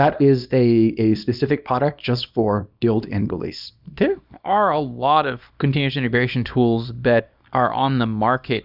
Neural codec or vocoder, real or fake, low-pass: codec, 24 kHz, 0.9 kbps, WavTokenizer, small release; fake; 5.4 kHz